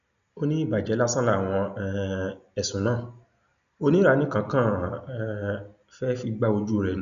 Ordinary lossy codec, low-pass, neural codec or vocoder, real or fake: none; 7.2 kHz; none; real